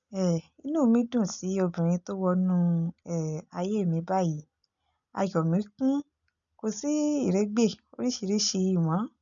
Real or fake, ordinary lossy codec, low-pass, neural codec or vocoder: real; none; 7.2 kHz; none